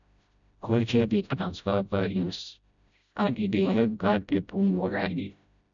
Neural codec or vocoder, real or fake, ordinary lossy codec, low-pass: codec, 16 kHz, 0.5 kbps, FreqCodec, smaller model; fake; none; 7.2 kHz